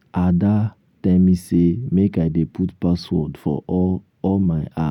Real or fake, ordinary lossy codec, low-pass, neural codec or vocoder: fake; none; 19.8 kHz; vocoder, 44.1 kHz, 128 mel bands every 256 samples, BigVGAN v2